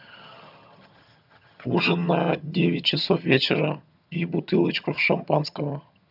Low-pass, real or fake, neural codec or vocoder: 5.4 kHz; fake; vocoder, 22.05 kHz, 80 mel bands, HiFi-GAN